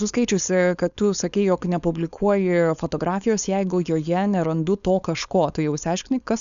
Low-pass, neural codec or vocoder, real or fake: 7.2 kHz; codec, 16 kHz, 4.8 kbps, FACodec; fake